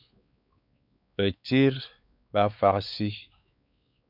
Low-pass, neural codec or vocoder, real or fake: 5.4 kHz; codec, 16 kHz, 4 kbps, X-Codec, WavLM features, trained on Multilingual LibriSpeech; fake